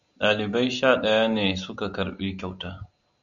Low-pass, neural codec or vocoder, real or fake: 7.2 kHz; none; real